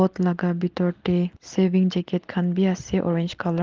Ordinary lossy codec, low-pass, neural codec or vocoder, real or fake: Opus, 16 kbps; 7.2 kHz; none; real